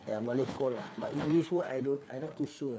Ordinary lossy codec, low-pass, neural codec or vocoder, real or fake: none; none; codec, 16 kHz, 4 kbps, FreqCodec, larger model; fake